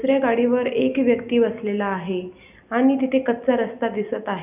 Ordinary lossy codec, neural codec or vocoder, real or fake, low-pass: none; none; real; 3.6 kHz